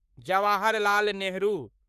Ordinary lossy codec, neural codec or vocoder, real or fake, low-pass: none; codec, 44.1 kHz, 3.4 kbps, Pupu-Codec; fake; 14.4 kHz